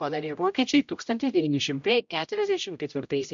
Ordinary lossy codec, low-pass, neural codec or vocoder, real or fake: MP3, 64 kbps; 7.2 kHz; codec, 16 kHz, 0.5 kbps, X-Codec, HuBERT features, trained on general audio; fake